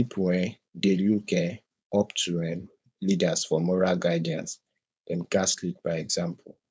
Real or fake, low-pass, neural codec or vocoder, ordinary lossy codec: fake; none; codec, 16 kHz, 4.8 kbps, FACodec; none